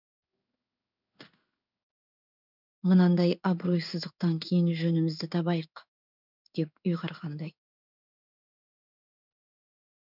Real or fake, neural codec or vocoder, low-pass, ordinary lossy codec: fake; codec, 16 kHz in and 24 kHz out, 1 kbps, XY-Tokenizer; 5.4 kHz; none